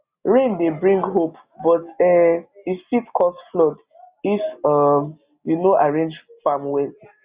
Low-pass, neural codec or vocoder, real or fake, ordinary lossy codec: 3.6 kHz; none; real; Opus, 64 kbps